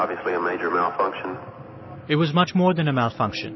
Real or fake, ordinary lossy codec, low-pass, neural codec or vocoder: real; MP3, 24 kbps; 7.2 kHz; none